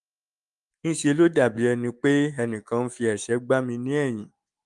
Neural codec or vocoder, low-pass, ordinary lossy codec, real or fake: autoencoder, 48 kHz, 128 numbers a frame, DAC-VAE, trained on Japanese speech; 10.8 kHz; Opus, 32 kbps; fake